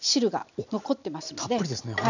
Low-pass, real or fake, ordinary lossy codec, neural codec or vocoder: 7.2 kHz; real; none; none